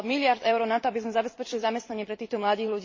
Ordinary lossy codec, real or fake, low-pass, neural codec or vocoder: MP3, 32 kbps; fake; 7.2 kHz; vocoder, 44.1 kHz, 128 mel bands every 256 samples, BigVGAN v2